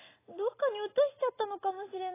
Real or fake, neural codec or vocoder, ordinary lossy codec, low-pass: real; none; AAC, 16 kbps; 3.6 kHz